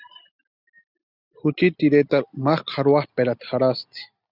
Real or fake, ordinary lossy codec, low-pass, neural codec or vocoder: real; Opus, 64 kbps; 5.4 kHz; none